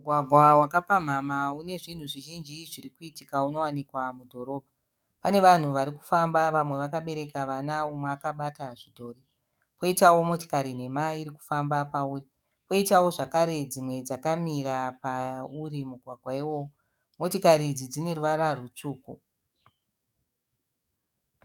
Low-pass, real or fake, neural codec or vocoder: 19.8 kHz; fake; codec, 44.1 kHz, 7.8 kbps, DAC